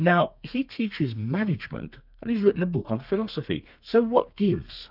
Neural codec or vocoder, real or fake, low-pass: codec, 32 kHz, 1.9 kbps, SNAC; fake; 5.4 kHz